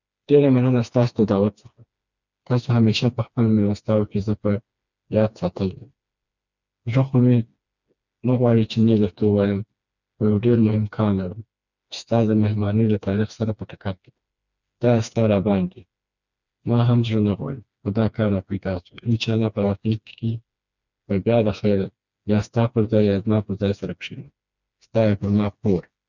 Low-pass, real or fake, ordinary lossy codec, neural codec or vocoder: 7.2 kHz; fake; none; codec, 16 kHz, 2 kbps, FreqCodec, smaller model